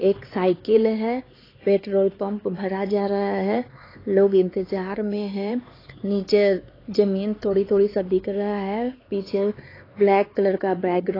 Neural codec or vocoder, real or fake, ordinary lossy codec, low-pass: codec, 16 kHz, 4 kbps, X-Codec, HuBERT features, trained on LibriSpeech; fake; AAC, 24 kbps; 5.4 kHz